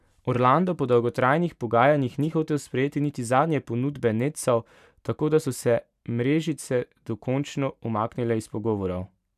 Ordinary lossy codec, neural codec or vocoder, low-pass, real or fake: none; vocoder, 44.1 kHz, 128 mel bands every 512 samples, BigVGAN v2; 14.4 kHz; fake